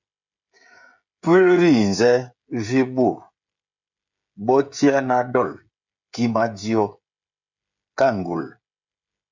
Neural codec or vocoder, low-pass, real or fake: codec, 16 kHz, 16 kbps, FreqCodec, smaller model; 7.2 kHz; fake